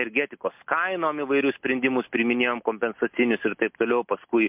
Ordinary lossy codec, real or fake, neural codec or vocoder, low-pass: MP3, 32 kbps; real; none; 3.6 kHz